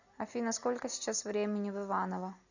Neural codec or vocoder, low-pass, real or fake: none; 7.2 kHz; real